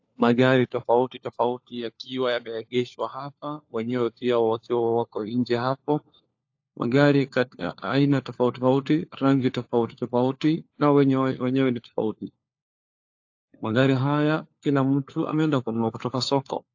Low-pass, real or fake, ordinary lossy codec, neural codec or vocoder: 7.2 kHz; fake; AAC, 48 kbps; codec, 16 kHz, 4 kbps, FunCodec, trained on LibriTTS, 50 frames a second